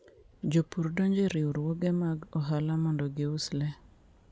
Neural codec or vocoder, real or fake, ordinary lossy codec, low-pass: none; real; none; none